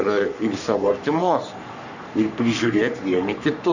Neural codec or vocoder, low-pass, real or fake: codec, 44.1 kHz, 3.4 kbps, Pupu-Codec; 7.2 kHz; fake